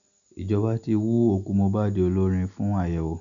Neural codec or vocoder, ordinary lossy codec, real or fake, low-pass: none; none; real; 7.2 kHz